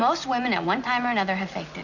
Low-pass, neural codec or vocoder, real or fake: 7.2 kHz; none; real